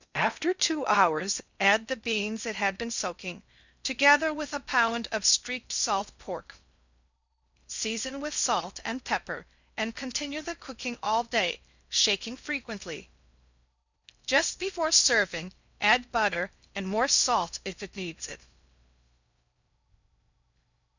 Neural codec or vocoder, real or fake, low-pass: codec, 16 kHz in and 24 kHz out, 0.6 kbps, FocalCodec, streaming, 2048 codes; fake; 7.2 kHz